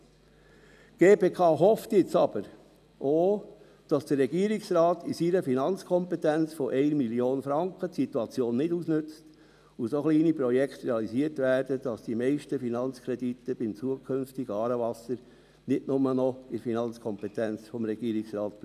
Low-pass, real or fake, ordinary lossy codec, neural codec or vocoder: 14.4 kHz; real; none; none